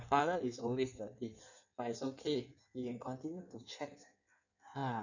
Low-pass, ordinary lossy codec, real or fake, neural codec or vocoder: 7.2 kHz; none; fake; codec, 16 kHz in and 24 kHz out, 1.1 kbps, FireRedTTS-2 codec